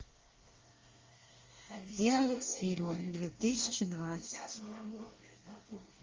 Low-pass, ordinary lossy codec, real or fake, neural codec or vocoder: 7.2 kHz; Opus, 32 kbps; fake; codec, 24 kHz, 1 kbps, SNAC